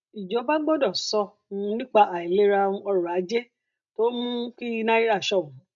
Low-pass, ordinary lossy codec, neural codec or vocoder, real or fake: 7.2 kHz; MP3, 96 kbps; none; real